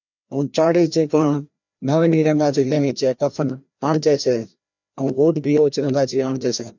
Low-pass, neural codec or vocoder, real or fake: 7.2 kHz; codec, 16 kHz, 1 kbps, FreqCodec, larger model; fake